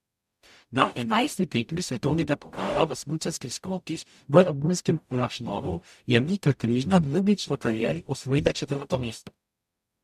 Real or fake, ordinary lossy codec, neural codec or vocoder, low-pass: fake; MP3, 96 kbps; codec, 44.1 kHz, 0.9 kbps, DAC; 14.4 kHz